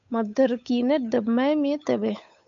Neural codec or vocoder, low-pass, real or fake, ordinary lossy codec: codec, 16 kHz, 8 kbps, FunCodec, trained on Chinese and English, 25 frames a second; 7.2 kHz; fake; AAC, 64 kbps